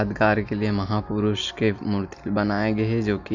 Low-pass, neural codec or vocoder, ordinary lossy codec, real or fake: 7.2 kHz; none; Opus, 64 kbps; real